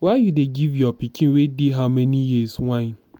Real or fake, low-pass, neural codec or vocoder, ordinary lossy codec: real; 19.8 kHz; none; MP3, 96 kbps